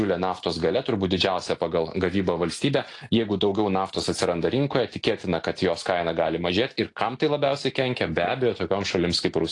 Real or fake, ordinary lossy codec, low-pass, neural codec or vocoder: real; AAC, 48 kbps; 10.8 kHz; none